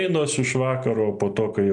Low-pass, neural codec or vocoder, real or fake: 9.9 kHz; none; real